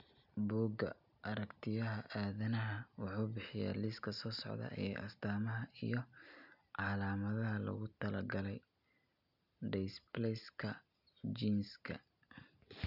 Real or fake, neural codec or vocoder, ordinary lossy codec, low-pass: real; none; none; 5.4 kHz